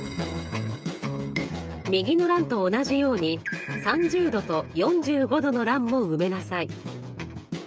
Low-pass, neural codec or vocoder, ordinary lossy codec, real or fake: none; codec, 16 kHz, 8 kbps, FreqCodec, smaller model; none; fake